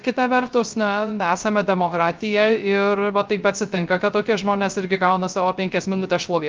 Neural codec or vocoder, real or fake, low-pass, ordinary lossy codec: codec, 16 kHz, 0.3 kbps, FocalCodec; fake; 7.2 kHz; Opus, 24 kbps